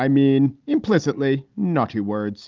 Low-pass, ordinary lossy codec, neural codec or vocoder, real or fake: 7.2 kHz; Opus, 32 kbps; none; real